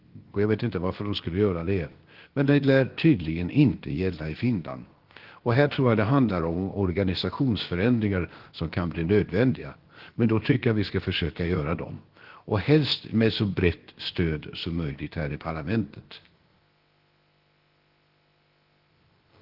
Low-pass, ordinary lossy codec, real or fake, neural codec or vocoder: 5.4 kHz; Opus, 16 kbps; fake; codec, 16 kHz, about 1 kbps, DyCAST, with the encoder's durations